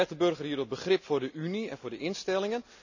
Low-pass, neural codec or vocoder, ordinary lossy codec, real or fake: 7.2 kHz; none; none; real